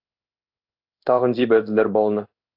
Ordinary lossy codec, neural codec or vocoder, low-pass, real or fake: AAC, 48 kbps; codec, 16 kHz in and 24 kHz out, 1 kbps, XY-Tokenizer; 5.4 kHz; fake